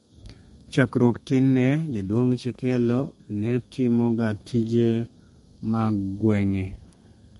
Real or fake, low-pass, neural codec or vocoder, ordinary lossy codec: fake; 14.4 kHz; codec, 32 kHz, 1.9 kbps, SNAC; MP3, 48 kbps